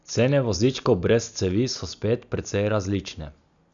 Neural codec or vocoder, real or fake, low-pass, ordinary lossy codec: none; real; 7.2 kHz; none